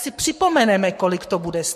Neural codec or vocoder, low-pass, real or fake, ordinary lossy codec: codec, 44.1 kHz, 7.8 kbps, Pupu-Codec; 14.4 kHz; fake; MP3, 64 kbps